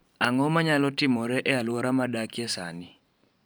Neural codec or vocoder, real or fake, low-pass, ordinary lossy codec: vocoder, 44.1 kHz, 128 mel bands every 512 samples, BigVGAN v2; fake; none; none